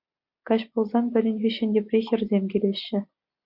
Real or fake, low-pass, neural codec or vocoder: real; 5.4 kHz; none